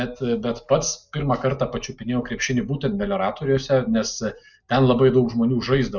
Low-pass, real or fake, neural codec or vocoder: 7.2 kHz; real; none